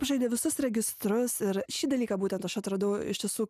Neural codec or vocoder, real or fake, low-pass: none; real; 14.4 kHz